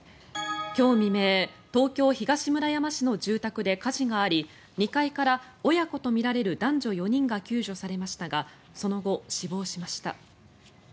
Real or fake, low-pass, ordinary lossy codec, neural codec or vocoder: real; none; none; none